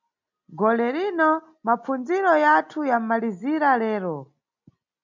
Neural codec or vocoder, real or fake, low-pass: none; real; 7.2 kHz